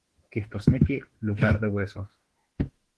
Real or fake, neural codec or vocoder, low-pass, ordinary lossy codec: fake; autoencoder, 48 kHz, 32 numbers a frame, DAC-VAE, trained on Japanese speech; 10.8 kHz; Opus, 16 kbps